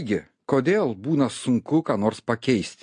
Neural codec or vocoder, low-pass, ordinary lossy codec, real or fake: none; 9.9 kHz; MP3, 48 kbps; real